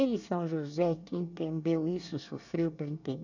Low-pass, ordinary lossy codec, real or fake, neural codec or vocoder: 7.2 kHz; none; fake; codec, 24 kHz, 1 kbps, SNAC